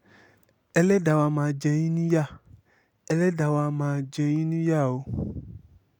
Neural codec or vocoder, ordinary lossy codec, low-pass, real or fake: none; none; 19.8 kHz; real